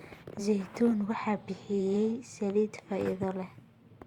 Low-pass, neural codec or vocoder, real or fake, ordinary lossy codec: 19.8 kHz; vocoder, 44.1 kHz, 128 mel bands every 512 samples, BigVGAN v2; fake; Opus, 64 kbps